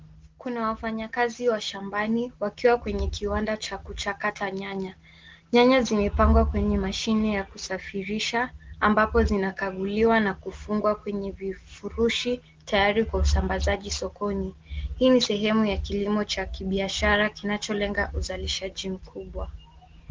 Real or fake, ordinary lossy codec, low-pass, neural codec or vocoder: real; Opus, 16 kbps; 7.2 kHz; none